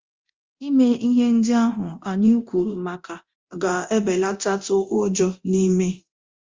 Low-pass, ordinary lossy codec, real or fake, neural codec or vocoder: 7.2 kHz; Opus, 32 kbps; fake; codec, 24 kHz, 0.9 kbps, DualCodec